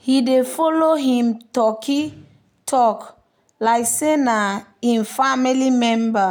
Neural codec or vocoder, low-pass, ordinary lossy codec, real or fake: none; none; none; real